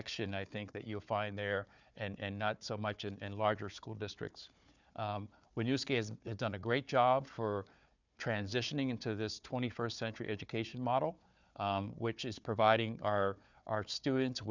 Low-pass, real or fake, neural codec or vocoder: 7.2 kHz; fake; codec, 16 kHz, 4 kbps, FunCodec, trained on Chinese and English, 50 frames a second